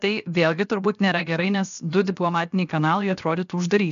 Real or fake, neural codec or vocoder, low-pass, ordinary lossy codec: fake; codec, 16 kHz, about 1 kbps, DyCAST, with the encoder's durations; 7.2 kHz; MP3, 96 kbps